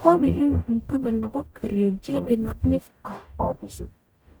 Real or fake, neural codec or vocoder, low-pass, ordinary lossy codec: fake; codec, 44.1 kHz, 0.9 kbps, DAC; none; none